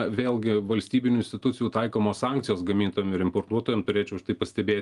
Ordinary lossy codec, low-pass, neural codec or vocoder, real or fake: Opus, 32 kbps; 10.8 kHz; none; real